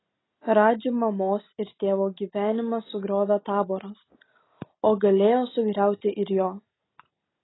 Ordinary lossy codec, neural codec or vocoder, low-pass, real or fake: AAC, 16 kbps; none; 7.2 kHz; real